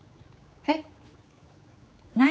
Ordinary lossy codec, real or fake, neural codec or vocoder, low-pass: none; fake; codec, 16 kHz, 4 kbps, X-Codec, HuBERT features, trained on general audio; none